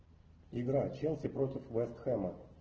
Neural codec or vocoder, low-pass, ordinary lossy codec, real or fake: none; 7.2 kHz; Opus, 16 kbps; real